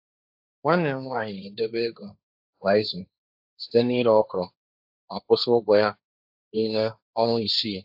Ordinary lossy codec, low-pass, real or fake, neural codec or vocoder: AAC, 48 kbps; 5.4 kHz; fake; codec, 16 kHz, 1.1 kbps, Voila-Tokenizer